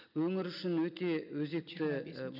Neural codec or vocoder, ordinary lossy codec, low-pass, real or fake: none; none; 5.4 kHz; real